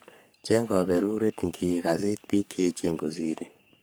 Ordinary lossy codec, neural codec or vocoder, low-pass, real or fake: none; codec, 44.1 kHz, 3.4 kbps, Pupu-Codec; none; fake